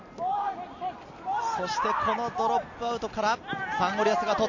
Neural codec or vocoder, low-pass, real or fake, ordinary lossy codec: none; 7.2 kHz; real; AAC, 48 kbps